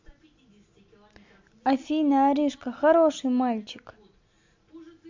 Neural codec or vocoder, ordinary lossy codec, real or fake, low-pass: none; MP3, 64 kbps; real; 7.2 kHz